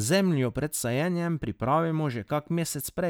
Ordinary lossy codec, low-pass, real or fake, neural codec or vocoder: none; none; real; none